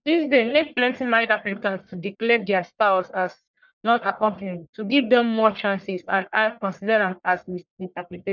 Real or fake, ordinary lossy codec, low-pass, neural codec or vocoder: fake; none; 7.2 kHz; codec, 44.1 kHz, 1.7 kbps, Pupu-Codec